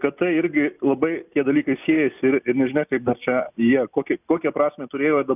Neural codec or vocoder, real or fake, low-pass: none; real; 3.6 kHz